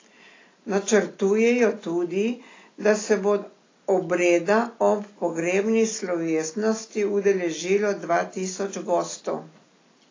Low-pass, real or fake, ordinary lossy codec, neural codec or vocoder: 7.2 kHz; real; AAC, 32 kbps; none